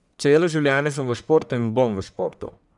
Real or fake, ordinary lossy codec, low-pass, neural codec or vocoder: fake; none; 10.8 kHz; codec, 44.1 kHz, 1.7 kbps, Pupu-Codec